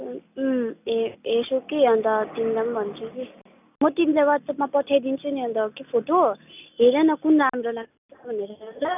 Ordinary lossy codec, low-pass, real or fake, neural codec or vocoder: none; 3.6 kHz; real; none